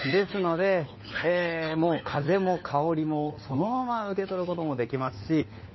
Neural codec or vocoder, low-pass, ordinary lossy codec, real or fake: codec, 16 kHz, 4 kbps, FunCodec, trained on LibriTTS, 50 frames a second; 7.2 kHz; MP3, 24 kbps; fake